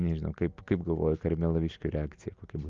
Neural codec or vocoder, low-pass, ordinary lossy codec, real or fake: none; 7.2 kHz; Opus, 32 kbps; real